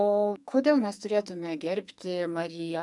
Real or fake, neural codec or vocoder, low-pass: fake; codec, 44.1 kHz, 2.6 kbps, SNAC; 10.8 kHz